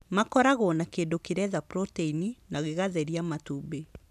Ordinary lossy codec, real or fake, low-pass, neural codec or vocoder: none; real; 14.4 kHz; none